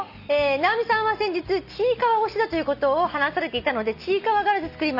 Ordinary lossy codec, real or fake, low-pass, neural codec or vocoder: none; real; 5.4 kHz; none